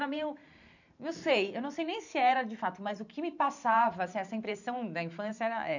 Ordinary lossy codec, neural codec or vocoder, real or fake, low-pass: none; vocoder, 44.1 kHz, 128 mel bands every 512 samples, BigVGAN v2; fake; 7.2 kHz